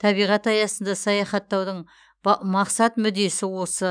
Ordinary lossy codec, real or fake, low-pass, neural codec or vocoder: none; fake; 9.9 kHz; autoencoder, 48 kHz, 128 numbers a frame, DAC-VAE, trained on Japanese speech